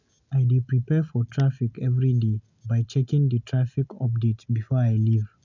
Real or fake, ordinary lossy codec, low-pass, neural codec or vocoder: real; none; 7.2 kHz; none